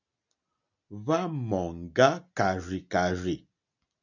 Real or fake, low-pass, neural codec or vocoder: real; 7.2 kHz; none